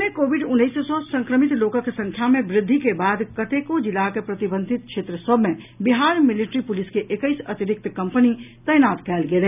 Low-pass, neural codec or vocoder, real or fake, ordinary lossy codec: 3.6 kHz; none; real; none